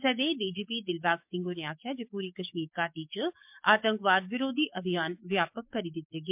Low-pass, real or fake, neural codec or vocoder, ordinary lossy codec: 3.6 kHz; fake; codec, 44.1 kHz, 7.8 kbps, DAC; MP3, 32 kbps